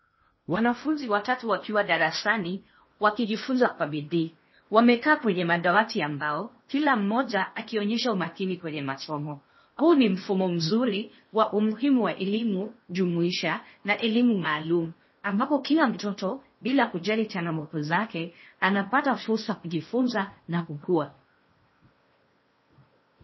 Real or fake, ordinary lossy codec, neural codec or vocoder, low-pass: fake; MP3, 24 kbps; codec, 16 kHz in and 24 kHz out, 0.8 kbps, FocalCodec, streaming, 65536 codes; 7.2 kHz